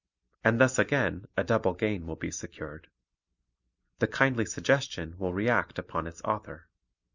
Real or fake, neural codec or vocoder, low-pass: real; none; 7.2 kHz